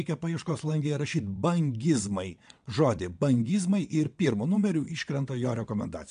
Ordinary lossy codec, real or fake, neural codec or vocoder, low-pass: MP3, 64 kbps; fake; vocoder, 22.05 kHz, 80 mel bands, WaveNeXt; 9.9 kHz